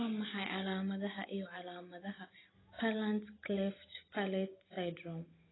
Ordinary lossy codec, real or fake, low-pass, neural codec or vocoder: AAC, 16 kbps; real; 7.2 kHz; none